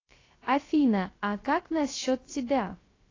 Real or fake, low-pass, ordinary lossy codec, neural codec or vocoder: fake; 7.2 kHz; AAC, 32 kbps; codec, 16 kHz, 0.3 kbps, FocalCodec